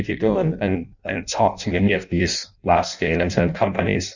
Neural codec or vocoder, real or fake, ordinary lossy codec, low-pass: codec, 16 kHz in and 24 kHz out, 0.6 kbps, FireRedTTS-2 codec; fake; Opus, 64 kbps; 7.2 kHz